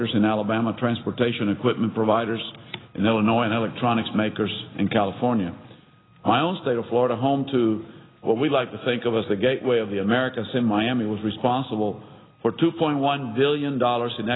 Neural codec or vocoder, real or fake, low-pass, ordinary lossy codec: none; real; 7.2 kHz; AAC, 16 kbps